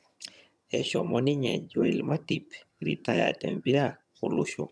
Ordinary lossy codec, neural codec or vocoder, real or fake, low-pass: none; vocoder, 22.05 kHz, 80 mel bands, HiFi-GAN; fake; none